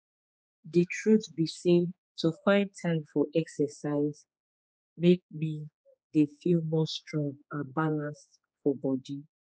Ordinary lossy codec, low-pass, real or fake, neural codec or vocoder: none; none; fake; codec, 16 kHz, 4 kbps, X-Codec, HuBERT features, trained on general audio